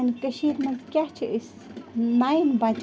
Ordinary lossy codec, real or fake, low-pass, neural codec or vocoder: none; real; none; none